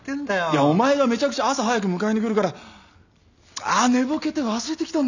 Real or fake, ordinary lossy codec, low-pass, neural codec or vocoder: real; none; 7.2 kHz; none